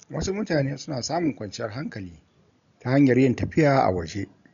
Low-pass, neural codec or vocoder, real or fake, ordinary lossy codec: 7.2 kHz; none; real; none